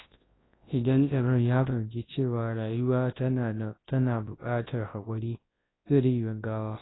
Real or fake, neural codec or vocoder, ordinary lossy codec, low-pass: fake; codec, 24 kHz, 0.9 kbps, WavTokenizer, large speech release; AAC, 16 kbps; 7.2 kHz